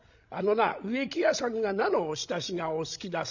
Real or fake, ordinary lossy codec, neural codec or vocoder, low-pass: fake; none; codec, 16 kHz, 16 kbps, FreqCodec, larger model; 7.2 kHz